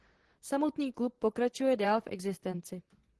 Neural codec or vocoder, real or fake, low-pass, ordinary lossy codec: vocoder, 44.1 kHz, 128 mel bands, Pupu-Vocoder; fake; 10.8 kHz; Opus, 16 kbps